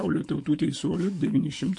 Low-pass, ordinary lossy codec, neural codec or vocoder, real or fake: 10.8 kHz; MP3, 48 kbps; autoencoder, 48 kHz, 128 numbers a frame, DAC-VAE, trained on Japanese speech; fake